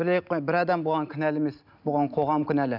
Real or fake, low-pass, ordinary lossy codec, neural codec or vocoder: real; 5.4 kHz; none; none